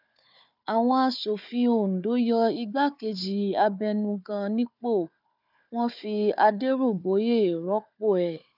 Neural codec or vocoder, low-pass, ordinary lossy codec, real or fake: codec, 16 kHz, 4 kbps, FunCodec, trained on Chinese and English, 50 frames a second; 5.4 kHz; none; fake